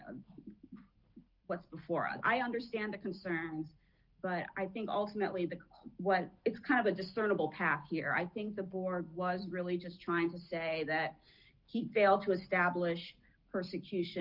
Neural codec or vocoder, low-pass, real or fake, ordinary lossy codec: none; 5.4 kHz; real; Opus, 24 kbps